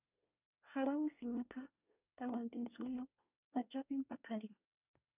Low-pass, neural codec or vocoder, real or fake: 3.6 kHz; codec, 24 kHz, 1 kbps, SNAC; fake